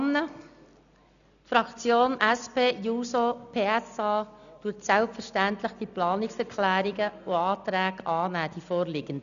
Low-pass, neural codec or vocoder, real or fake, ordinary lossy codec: 7.2 kHz; none; real; none